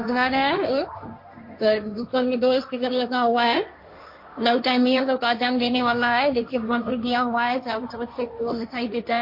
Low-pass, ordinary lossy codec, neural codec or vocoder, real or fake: 5.4 kHz; MP3, 32 kbps; codec, 16 kHz, 1.1 kbps, Voila-Tokenizer; fake